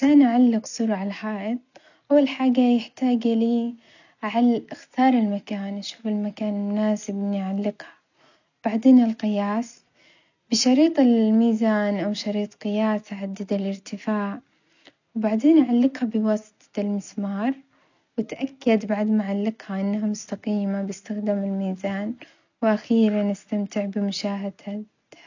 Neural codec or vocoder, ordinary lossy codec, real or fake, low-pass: none; none; real; 7.2 kHz